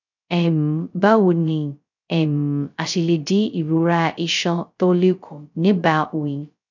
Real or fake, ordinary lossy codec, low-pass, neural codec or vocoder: fake; none; 7.2 kHz; codec, 16 kHz, 0.2 kbps, FocalCodec